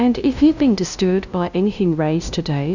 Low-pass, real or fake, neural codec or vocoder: 7.2 kHz; fake; codec, 16 kHz, 0.5 kbps, FunCodec, trained on LibriTTS, 25 frames a second